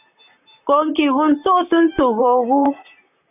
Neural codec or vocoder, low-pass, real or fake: vocoder, 44.1 kHz, 128 mel bands, Pupu-Vocoder; 3.6 kHz; fake